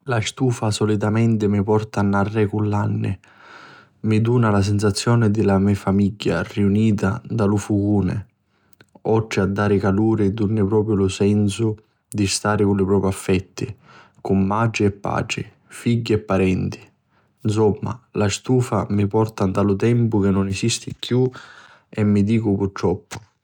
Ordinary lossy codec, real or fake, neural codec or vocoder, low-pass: none; real; none; 19.8 kHz